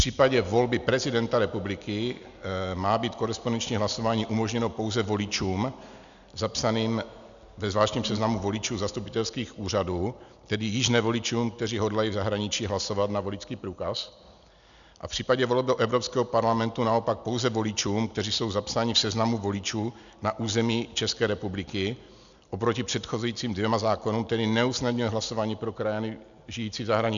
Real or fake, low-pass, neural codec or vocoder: real; 7.2 kHz; none